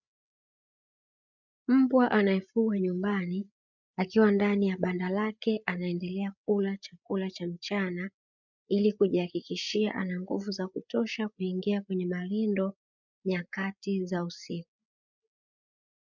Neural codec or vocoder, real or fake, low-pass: codec, 16 kHz, 8 kbps, FreqCodec, larger model; fake; 7.2 kHz